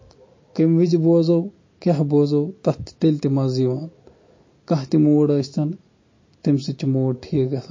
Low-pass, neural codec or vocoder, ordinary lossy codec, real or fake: 7.2 kHz; none; MP3, 32 kbps; real